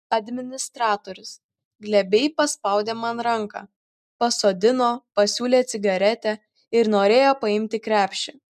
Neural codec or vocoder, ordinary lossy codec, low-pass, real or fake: none; MP3, 96 kbps; 14.4 kHz; real